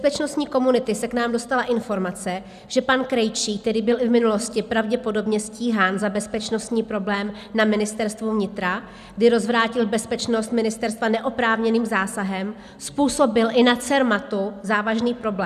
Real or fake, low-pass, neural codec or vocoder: real; 14.4 kHz; none